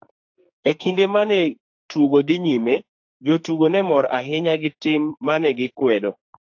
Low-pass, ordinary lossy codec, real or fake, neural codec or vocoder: 7.2 kHz; AAC, 48 kbps; fake; codec, 44.1 kHz, 2.6 kbps, SNAC